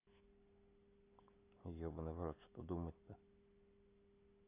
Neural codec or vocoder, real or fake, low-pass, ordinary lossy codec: none; real; 3.6 kHz; AAC, 24 kbps